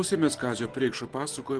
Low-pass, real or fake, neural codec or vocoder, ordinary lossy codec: 10.8 kHz; real; none; Opus, 16 kbps